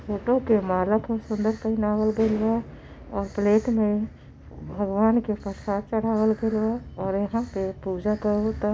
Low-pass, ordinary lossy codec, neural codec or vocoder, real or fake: none; none; none; real